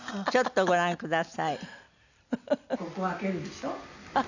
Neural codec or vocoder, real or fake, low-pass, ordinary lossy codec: none; real; 7.2 kHz; none